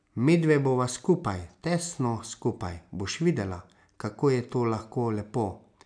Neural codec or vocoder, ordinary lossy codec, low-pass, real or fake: none; none; 9.9 kHz; real